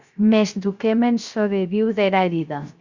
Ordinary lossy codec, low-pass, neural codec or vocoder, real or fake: Opus, 64 kbps; 7.2 kHz; codec, 16 kHz, 0.3 kbps, FocalCodec; fake